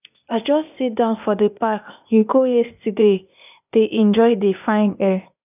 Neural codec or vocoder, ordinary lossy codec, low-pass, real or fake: codec, 16 kHz, 0.8 kbps, ZipCodec; none; 3.6 kHz; fake